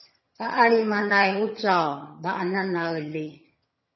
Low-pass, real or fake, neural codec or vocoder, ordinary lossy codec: 7.2 kHz; fake; vocoder, 22.05 kHz, 80 mel bands, HiFi-GAN; MP3, 24 kbps